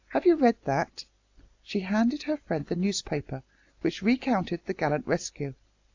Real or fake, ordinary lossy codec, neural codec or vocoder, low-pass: real; AAC, 48 kbps; none; 7.2 kHz